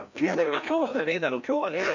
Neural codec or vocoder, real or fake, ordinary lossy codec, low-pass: codec, 16 kHz, 1 kbps, FreqCodec, larger model; fake; none; 7.2 kHz